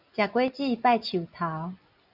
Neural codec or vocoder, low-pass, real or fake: none; 5.4 kHz; real